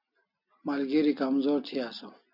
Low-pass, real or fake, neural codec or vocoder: 5.4 kHz; real; none